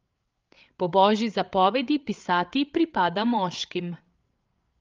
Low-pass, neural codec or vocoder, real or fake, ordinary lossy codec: 7.2 kHz; codec, 16 kHz, 8 kbps, FreqCodec, larger model; fake; Opus, 32 kbps